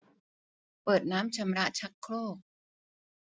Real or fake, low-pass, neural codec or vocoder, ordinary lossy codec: real; none; none; none